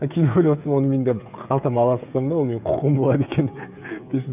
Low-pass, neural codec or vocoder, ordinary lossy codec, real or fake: 3.6 kHz; codec, 16 kHz, 16 kbps, FreqCodec, smaller model; none; fake